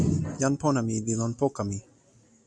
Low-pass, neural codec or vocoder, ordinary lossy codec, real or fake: 9.9 kHz; none; MP3, 96 kbps; real